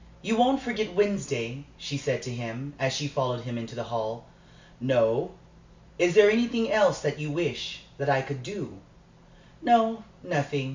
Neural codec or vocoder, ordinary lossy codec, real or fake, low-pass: none; MP3, 64 kbps; real; 7.2 kHz